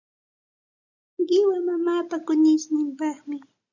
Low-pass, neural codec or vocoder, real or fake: 7.2 kHz; none; real